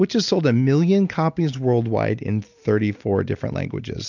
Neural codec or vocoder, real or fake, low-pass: none; real; 7.2 kHz